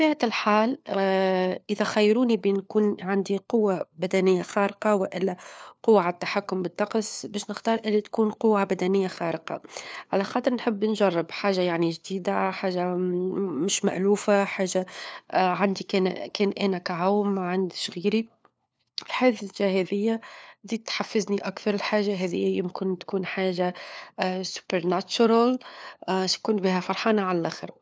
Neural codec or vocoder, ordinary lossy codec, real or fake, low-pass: codec, 16 kHz, 4 kbps, FunCodec, trained on LibriTTS, 50 frames a second; none; fake; none